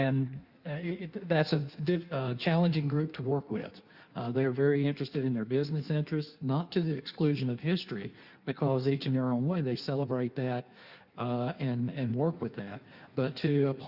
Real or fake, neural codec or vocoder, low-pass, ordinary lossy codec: fake; codec, 16 kHz in and 24 kHz out, 1.1 kbps, FireRedTTS-2 codec; 5.4 kHz; Opus, 64 kbps